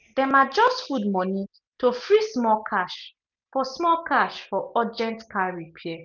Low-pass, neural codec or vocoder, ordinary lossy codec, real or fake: 7.2 kHz; none; none; real